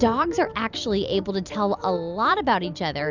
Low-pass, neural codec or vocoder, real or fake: 7.2 kHz; vocoder, 44.1 kHz, 128 mel bands every 256 samples, BigVGAN v2; fake